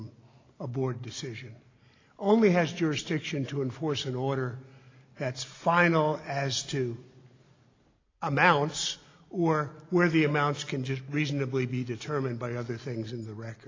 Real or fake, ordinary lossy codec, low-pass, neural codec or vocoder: real; AAC, 32 kbps; 7.2 kHz; none